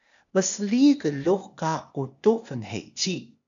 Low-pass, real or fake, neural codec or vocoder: 7.2 kHz; fake; codec, 16 kHz, 0.8 kbps, ZipCodec